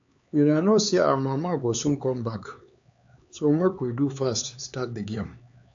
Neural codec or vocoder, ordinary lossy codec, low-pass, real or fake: codec, 16 kHz, 4 kbps, X-Codec, HuBERT features, trained on LibriSpeech; AAC, 64 kbps; 7.2 kHz; fake